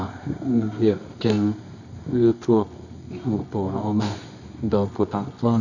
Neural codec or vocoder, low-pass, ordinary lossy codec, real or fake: codec, 24 kHz, 0.9 kbps, WavTokenizer, medium music audio release; 7.2 kHz; none; fake